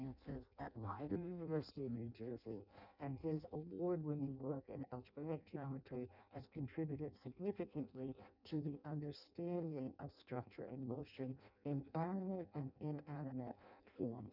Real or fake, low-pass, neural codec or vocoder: fake; 5.4 kHz; codec, 16 kHz in and 24 kHz out, 0.6 kbps, FireRedTTS-2 codec